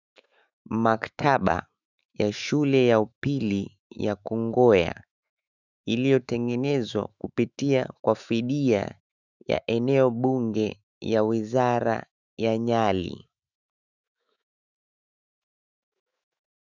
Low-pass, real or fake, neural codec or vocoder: 7.2 kHz; fake; autoencoder, 48 kHz, 128 numbers a frame, DAC-VAE, trained on Japanese speech